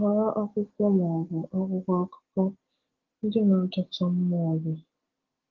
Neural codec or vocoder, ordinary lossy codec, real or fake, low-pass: none; Opus, 16 kbps; real; 7.2 kHz